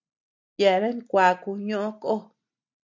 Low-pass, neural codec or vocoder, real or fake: 7.2 kHz; none; real